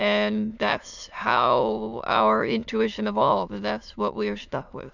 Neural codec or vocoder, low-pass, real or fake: autoencoder, 22.05 kHz, a latent of 192 numbers a frame, VITS, trained on many speakers; 7.2 kHz; fake